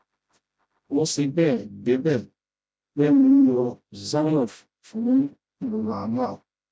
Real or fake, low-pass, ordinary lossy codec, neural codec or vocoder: fake; none; none; codec, 16 kHz, 0.5 kbps, FreqCodec, smaller model